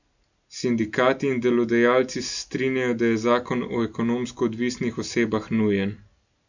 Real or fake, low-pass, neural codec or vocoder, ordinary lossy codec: real; 7.2 kHz; none; none